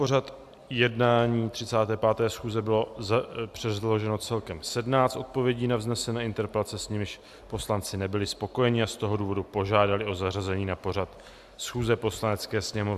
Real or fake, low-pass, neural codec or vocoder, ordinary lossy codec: real; 14.4 kHz; none; AAC, 96 kbps